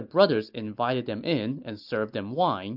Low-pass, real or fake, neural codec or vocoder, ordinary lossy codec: 5.4 kHz; real; none; AAC, 48 kbps